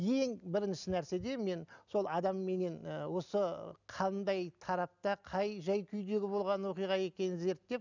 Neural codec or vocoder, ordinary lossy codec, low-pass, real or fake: none; none; 7.2 kHz; real